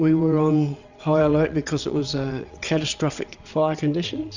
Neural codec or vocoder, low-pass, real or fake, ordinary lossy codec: vocoder, 22.05 kHz, 80 mel bands, WaveNeXt; 7.2 kHz; fake; Opus, 64 kbps